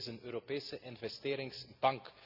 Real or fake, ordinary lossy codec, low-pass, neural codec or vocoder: real; none; 5.4 kHz; none